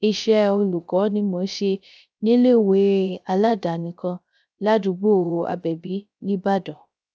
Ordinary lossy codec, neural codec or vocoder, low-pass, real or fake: none; codec, 16 kHz, 0.3 kbps, FocalCodec; none; fake